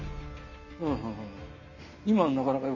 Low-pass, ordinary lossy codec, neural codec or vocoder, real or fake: 7.2 kHz; none; none; real